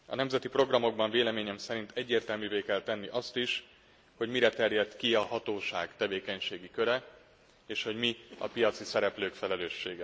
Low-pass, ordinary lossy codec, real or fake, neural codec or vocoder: none; none; real; none